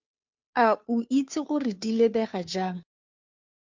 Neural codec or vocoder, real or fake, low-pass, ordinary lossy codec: codec, 16 kHz, 2 kbps, FunCodec, trained on Chinese and English, 25 frames a second; fake; 7.2 kHz; MP3, 64 kbps